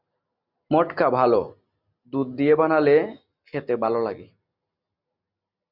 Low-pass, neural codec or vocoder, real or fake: 5.4 kHz; none; real